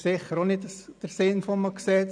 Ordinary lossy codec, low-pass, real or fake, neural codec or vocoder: none; 9.9 kHz; real; none